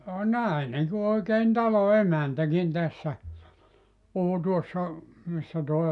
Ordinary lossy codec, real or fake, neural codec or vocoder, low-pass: none; real; none; 10.8 kHz